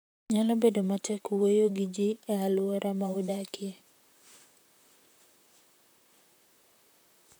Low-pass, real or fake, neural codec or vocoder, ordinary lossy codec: none; fake; vocoder, 44.1 kHz, 128 mel bands, Pupu-Vocoder; none